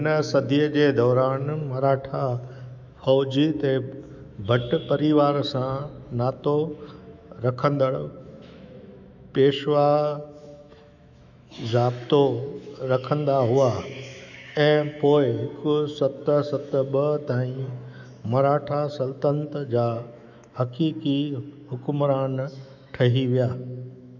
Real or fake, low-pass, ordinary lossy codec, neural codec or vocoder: fake; 7.2 kHz; none; autoencoder, 48 kHz, 128 numbers a frame, DAC-VAE, trained on Japanese speech